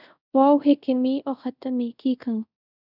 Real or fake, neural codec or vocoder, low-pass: fake; codec, 24 kHz, 0.5 kbps, DualCodec; 5.4 kHz